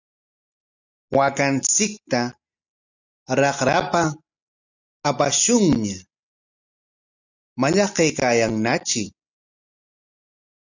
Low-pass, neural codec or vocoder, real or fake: 7.2 kHz; none; real